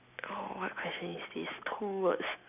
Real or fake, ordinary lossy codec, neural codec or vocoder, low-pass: real; none; none; 3.6 kHz